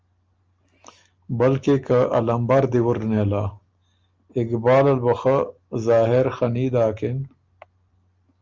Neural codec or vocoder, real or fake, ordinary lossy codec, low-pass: none; real; Opus, 24 kbps; 7.2 kHz